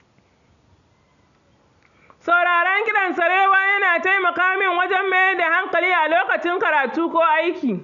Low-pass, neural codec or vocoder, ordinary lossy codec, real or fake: 7.2 kHz; none; none; real